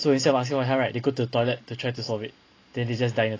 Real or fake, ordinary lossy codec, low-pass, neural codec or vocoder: real; AAC, 32 kbps; 7.2 kHz; none